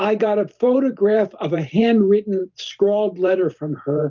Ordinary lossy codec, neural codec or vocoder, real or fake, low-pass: Opus, 24 kbps; vocoder, 44.1 kHz, 128 mel bands every 512 samples, BigVGAN v2; fake; 7.2 kHz